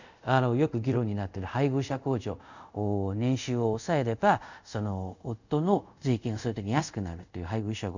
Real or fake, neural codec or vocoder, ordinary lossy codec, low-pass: fake; codec, 24 kHz, 0.5 kbps, DualCodec; none; 7.2 kHz